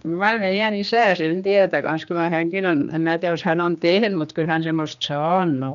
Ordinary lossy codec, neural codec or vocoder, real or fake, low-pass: none; codec, 16 kHz, 2 kbps, X-Codec, HuBERT features, trained on general audio; fake; 7.2 kHz